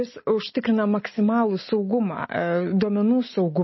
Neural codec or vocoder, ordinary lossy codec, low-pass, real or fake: none; MP3, 24 kbps; 7.2 kHz; real